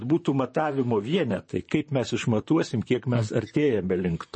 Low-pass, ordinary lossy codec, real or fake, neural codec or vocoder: 9.9 kHz; MP3, 32 kbps; fake; vocoder, 44.1 kHz, 128 mel bands, Pupu-Vocoder